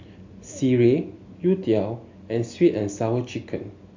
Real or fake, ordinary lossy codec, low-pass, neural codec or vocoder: real; MP3, 48 kbps; 7.2 kHz; none